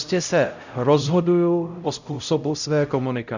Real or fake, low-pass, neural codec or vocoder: fake; 7.2 kHz; codec, 16 kHz, 0.5 kbps, X-Codec, HuBERT features, trained on LibriSpeech